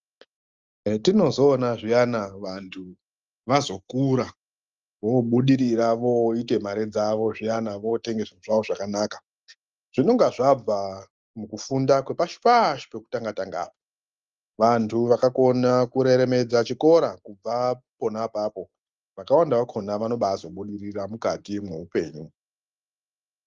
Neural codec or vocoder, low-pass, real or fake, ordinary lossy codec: none; 7.2 kHz; real; Opus, 32 kbps